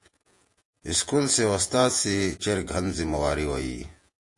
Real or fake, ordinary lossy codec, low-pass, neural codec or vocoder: fake; AAC, 64 kbps; 10.8 kHz; vocoder, 48 kHz, 128 mel bands, Vocos